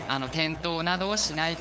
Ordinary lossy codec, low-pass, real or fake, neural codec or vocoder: none; none; fake; codec, 16 kHz, 8 kbps, FunCodec, trained on LibriTTS, 25 frames a second